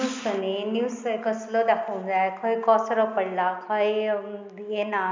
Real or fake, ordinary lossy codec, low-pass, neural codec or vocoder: real; MP3, 64 kbps; 7.2 kHz; none